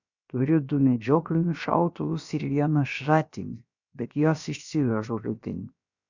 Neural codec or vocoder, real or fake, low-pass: codec, 16 kHz, about 1 kbps, DyCAST, with the encoder's durations; fake; 7.2 kHz